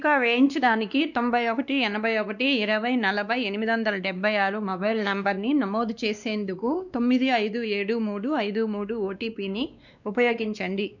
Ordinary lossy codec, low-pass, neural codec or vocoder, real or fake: none; 7.2 kHz; codec, 16 kHz, 2 kbps, X-Codec, WavLM features, trained on Multilingual LibriSpeech; fake